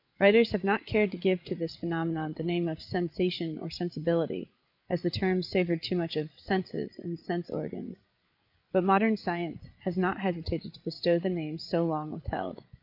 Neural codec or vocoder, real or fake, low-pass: codec, 16 kHz, 8 kbps, FreqCodec, larger model; fake; 5.4 kHz